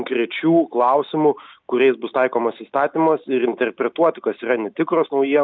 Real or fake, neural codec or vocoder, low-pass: real; none; 7.2 kHz